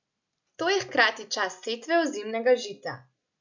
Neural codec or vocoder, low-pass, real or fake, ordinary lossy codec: none; 7.2 kHz; real; none